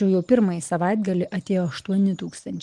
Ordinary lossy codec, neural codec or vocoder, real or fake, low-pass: Opus, 24 kbps; none; real; 10.8 kHz